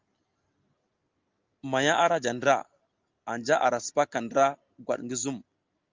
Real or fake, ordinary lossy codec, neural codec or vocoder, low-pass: real; Opus, 24 kbps; none; 7.2 kHz